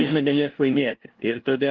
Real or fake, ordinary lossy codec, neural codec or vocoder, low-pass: fake; Opus, 16 kbps; codec, 16 kHz, 0.5 kbps, FunCodec, trained on LibriTTS, 25 frames a second; 7.2 kHz